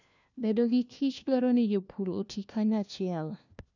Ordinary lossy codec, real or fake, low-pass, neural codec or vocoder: none; fake; 7.2 kHz; codec, 16 kHz, 1 kbps, FunCodec, trained on LibriTTS, 50 frames a second